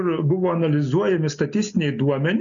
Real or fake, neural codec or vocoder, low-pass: real; none; 7.2 kHz